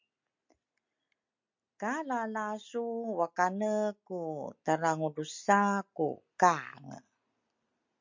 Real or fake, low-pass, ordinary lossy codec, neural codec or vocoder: real; 7.2 kHz; MP3, 48 kbps; none